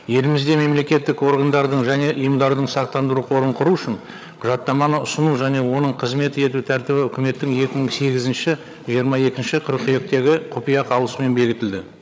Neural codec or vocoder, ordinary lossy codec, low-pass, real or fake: codec, 16 kHz, 8 kbps, FreqCodec, larger model; none; none; fake